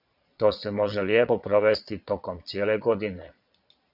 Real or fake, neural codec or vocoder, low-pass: fake; vocoder, 22.05 kHz, 80 mel bands, Vocos; 5.4 kHz